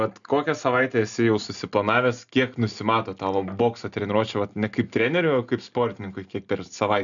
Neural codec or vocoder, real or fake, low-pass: none; real; 7.2 kHz